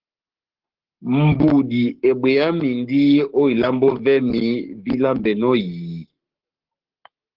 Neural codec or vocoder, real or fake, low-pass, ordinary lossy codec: codec, 44.1 kHz, 7.8 kbps, Pupu-Codec; fake; 5.4 kHz; Opus, 16 kbps